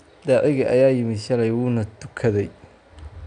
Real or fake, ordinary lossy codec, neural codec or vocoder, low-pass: real; none; none; 9.9 kHz